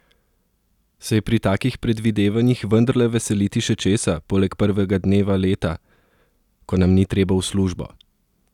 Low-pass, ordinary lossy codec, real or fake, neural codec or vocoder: 19.8 kHz; none; real; none